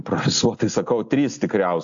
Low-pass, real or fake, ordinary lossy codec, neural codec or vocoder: 7.2 kHz; real; AAC, 64 kbps; none